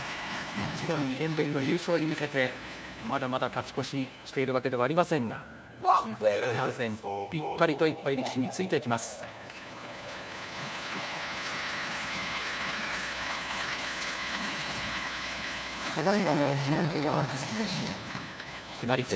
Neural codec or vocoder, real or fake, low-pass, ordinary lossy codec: codec, 16 kHz, 1 kbps, FunCodec, trained on LibriTTS, 50 frames a second; fake; none; none